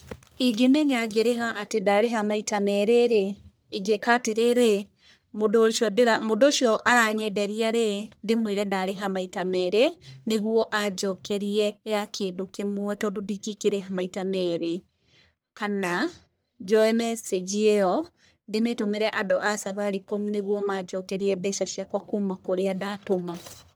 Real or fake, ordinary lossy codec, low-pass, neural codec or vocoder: fake; none; none; codec, 44.1 kHz, 1.7 kbps, Pupu-Codec